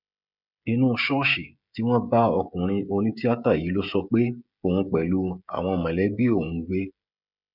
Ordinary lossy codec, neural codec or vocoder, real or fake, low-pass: none; codec, 16 kHz, 16 kbps, FreqCodec, smaller model; fake; 5.4 kHz